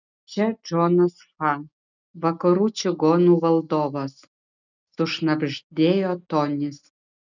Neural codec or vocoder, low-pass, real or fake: none; 7.2 kHz; real